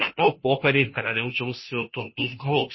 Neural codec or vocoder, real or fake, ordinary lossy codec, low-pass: codec, 16 kHz, 0.5 kbps, FunCodec, trained on Chinese and English, 25 frames a second; fake; MP3, 24 kbps; 7.2 kHz